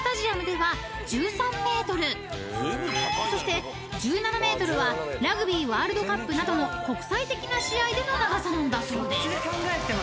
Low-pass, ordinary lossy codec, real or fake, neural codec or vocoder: none; none; real; none